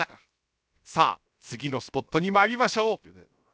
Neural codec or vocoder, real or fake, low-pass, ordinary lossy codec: codec, 16 kHz, 0.7 kbps, FocalCodec; fake; none; none